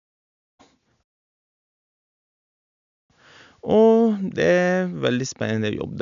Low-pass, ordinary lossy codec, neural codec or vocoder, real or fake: 7.2 kHz; none; none; real